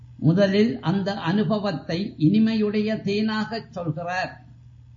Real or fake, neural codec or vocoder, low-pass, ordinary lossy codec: real; none; 7.2 kHz; MP3, 32 kbps